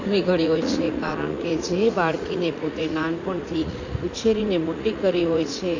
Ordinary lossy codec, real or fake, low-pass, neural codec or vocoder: AAC, 48 kbps; fake; 7.2 kHz; vocoder, 44.1 kHz, 80 mel bands, Vocos